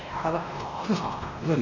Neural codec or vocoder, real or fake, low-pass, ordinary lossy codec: codec, 16 kHz, 1 kbps, X-Codec, WavLM features, trained on Multilingual LibriSpeech; fake; 7.2 kHz; none